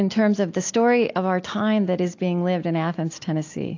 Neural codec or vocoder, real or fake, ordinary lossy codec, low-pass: none; real; AAC, 48 kbps; 7.2 kHz